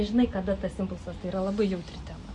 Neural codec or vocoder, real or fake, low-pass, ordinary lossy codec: none; real; 10.8 kHz; MP3, 48 kbps